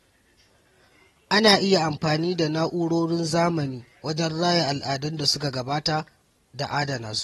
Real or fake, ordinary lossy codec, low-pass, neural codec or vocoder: real; AAC, 32 kbps; 19.8 kHz; none